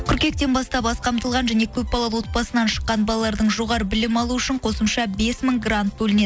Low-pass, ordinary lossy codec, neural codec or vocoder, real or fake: none; none; none; real